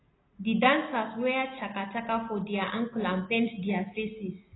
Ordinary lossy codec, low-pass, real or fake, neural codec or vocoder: AAC, 16 kbps; 7.2 kHz; real; none